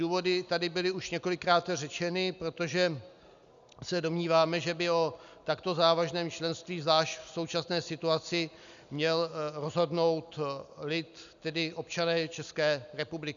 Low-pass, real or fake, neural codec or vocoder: 7.2 kHz; real; none